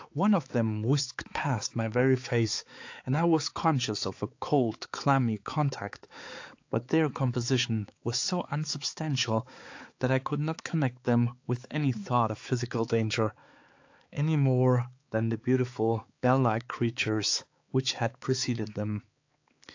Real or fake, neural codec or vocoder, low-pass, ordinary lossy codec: fake; codec, 16 kHz, 4 kbps, X-Codec, HuBERT features, trained on balanced general audio; 7.2 kHz; AAC, 48 kbps